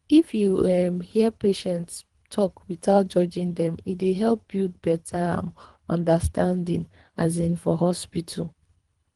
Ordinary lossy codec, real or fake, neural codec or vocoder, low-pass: Opus, 24 kbps; fake; codec, 24 kHz, 3 kbps, HILCodec; 10.8 kHz